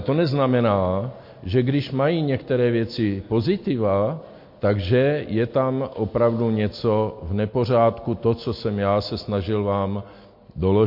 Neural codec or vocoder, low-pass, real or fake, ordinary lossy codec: none; 5.4 kHz; real; MP3, 32 kbps